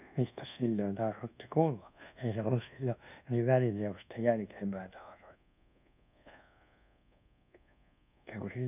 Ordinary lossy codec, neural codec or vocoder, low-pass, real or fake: none; codec, 24 kHz, 1.2 kbps, DualCodec; 3.6 kHz; fake